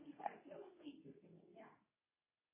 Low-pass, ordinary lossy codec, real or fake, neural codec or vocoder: 3.6 kHz; MP3, 16 kbps; fake; codec, 24 kHz, 0.9 kbps, WavTokenizer, medium speech release version 2